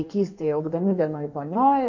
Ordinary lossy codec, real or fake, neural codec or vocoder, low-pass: MP3, 48 kbps; fake; codec, 16 kHz in and 24 kHz out, 1.1 kbps, FireRedTTS-2 codec; 7.2 kHz